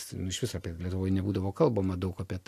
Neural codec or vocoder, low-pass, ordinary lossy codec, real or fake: none; 14.4 kHz; AAC, 64 kbps; real